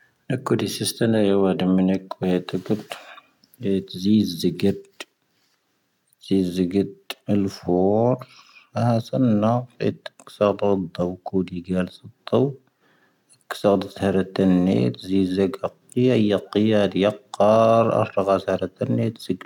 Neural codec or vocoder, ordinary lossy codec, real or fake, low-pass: none; none; real; 19.8 kHz